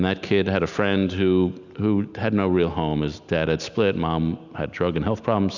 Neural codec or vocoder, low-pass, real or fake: none; 7.2 kHz; real